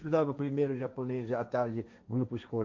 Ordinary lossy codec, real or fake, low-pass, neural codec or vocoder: MP3, 48 kbps; fake; 7.2 kHz; codec, 16 kHz, 1.1 kbps, Voila-Tokenizer